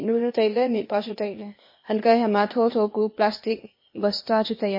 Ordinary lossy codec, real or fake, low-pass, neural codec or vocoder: MP3, 24 kbps; fake; 5.4 kHz; codec, 16 kHz, 0.8 kbps, ZipCodec